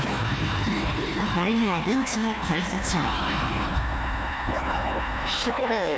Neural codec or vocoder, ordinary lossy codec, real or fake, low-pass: codec, 16 kHz, 1 kbps, FunCodec, trained on Chinese and English, 50 frames a second; none; fake; none